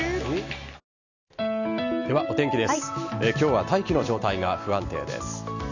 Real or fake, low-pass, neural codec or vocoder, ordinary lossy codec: real; 7.2 kHz; none; none